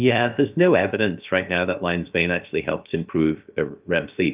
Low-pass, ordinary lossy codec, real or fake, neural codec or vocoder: 3.6 kHz; Opus, 24 kbps; fake; codec, 16 kHz, about 1 kbps, DyCAST, with the encoder's durations